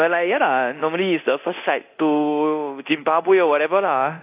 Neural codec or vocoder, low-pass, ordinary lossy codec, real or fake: codec, 24 kHz, 0.9 kbps, DualCodec; 3.6 kHz; AAC, 32 kbps; fake